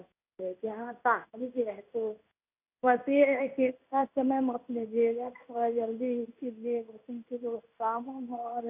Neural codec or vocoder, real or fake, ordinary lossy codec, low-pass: codec, 16 kHz in and 24 kHz out, 1 kbps, XY-Tokenizer; fake; none; 3.6 kHz